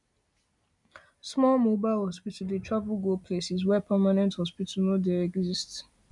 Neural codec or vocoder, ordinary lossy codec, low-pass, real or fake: none; none; 10.8 kHz; real